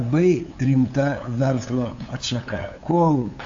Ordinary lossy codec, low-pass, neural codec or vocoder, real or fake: AAC, 48 kbps; 7.2 kHz; codec, 16 kHz, 8 kbps, FunCodec, trained on LibriTTS, 25 frames a second; fake